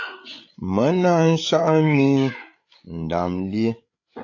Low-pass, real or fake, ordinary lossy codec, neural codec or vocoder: 7.2 kHz; fake; AAC, 48 kbps; codec, 16 kHz, 8 kbps, FreqCodec, larger model